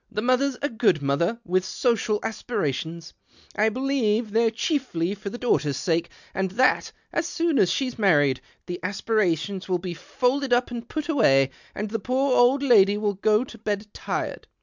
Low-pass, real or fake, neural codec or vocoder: 7.2 kHz; real; none